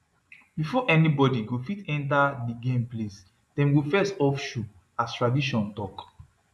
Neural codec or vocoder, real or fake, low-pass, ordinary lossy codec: vocoder, 24 kHz, 100 mel bands, Vocos; fake; none; none